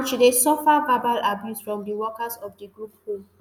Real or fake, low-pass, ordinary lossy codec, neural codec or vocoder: real; none; none; none